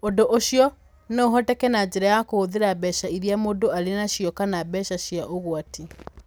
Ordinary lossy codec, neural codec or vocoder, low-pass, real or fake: none; none; none; real